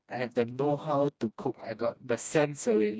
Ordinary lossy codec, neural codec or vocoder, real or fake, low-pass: none; codec, 16 kHz, 1 kbps, FreqCodec, smaller model; fake; none